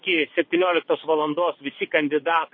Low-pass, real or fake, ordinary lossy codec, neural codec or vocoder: 7.2 kHz; fake; MP3, 24 kbps; vocoder, 22.05 kHz, 80 mel bands, WaveNeXt